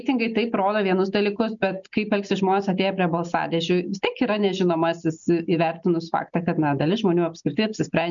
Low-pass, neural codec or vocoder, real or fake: 7.2 kHz; none; real